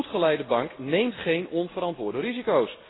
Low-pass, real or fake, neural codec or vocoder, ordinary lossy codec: 7.2 kHz; real; none; AAC, 16 kbps